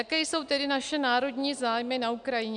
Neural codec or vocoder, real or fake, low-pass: none; real; 9.9 kHz